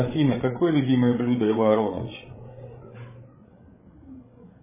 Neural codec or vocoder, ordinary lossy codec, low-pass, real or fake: codec, 16 kHz, 8 kbps, FreqCodec, larger model; MP3, 16 kbps; 3.6 kHz; fake